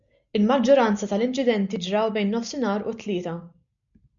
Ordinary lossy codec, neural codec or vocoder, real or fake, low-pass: MP3, 64 kbps; none; real; 7.2 kHz